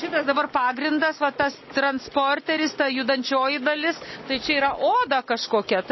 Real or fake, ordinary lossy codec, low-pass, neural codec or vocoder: real; MP3, 24 kbps; 7.2 kHz; none